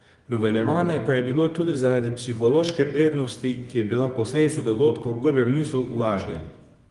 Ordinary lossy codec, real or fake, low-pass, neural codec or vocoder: Opus, 24 kbps; fake; 10.8 kHz; codec, 24 kHz, 0.9 kbps, WavTokenizer, medium music audio release